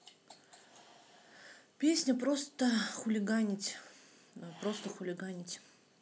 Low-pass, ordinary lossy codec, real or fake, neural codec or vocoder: none; none; real; none